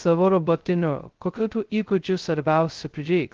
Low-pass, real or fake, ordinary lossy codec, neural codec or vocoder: 7.2 kHz; fake; Opus, 16 kbps; codec, 16 kHz, 0.2 kbps, FocalCodec